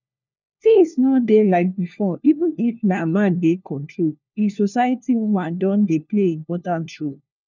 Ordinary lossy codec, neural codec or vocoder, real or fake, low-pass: none; codec, 16 kHz, 1 kbps, FunCodec, trained on LibriTTS, 50 frames a second; fake; 7.2 kHz